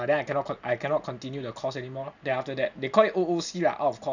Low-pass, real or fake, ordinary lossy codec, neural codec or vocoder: 7.2 kHz; real; none; none